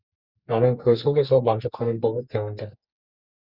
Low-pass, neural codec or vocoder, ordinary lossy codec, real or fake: 5.4 kHz; codec, 44.1 kHz, 2.6 kbps, SNAC; Opus, 64 kbps; fake